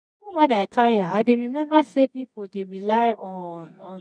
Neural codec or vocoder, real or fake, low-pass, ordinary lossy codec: codec, 24 kHz, 0.9 kbps, WavTokenizer, medium music audio release; fake; 9.9 kHz; none